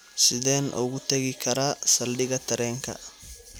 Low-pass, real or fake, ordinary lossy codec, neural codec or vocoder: none; real; none; none